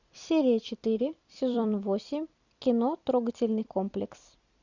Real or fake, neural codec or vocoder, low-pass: fake; vocoder, 44.1 kHz, 128 mel bands every 256 samples, BigVGAN v2; 7.2 kHz